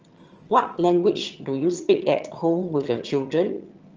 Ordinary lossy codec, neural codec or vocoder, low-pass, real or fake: Opus, 24 kbps; vocoder, 22.05 kHz, 80 mel bands, HiFi-GAN; 7.2 kHz; fake